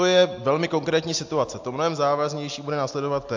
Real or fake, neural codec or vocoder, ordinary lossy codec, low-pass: real; none; MP3, 48 kbps; 7.2 kHz